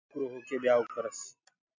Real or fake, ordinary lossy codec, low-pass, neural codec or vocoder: real; MP3, 48 kbps; 7.2 kHz; none